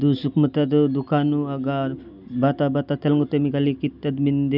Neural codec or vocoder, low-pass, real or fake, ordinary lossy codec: none; 5.4 kHz; real; none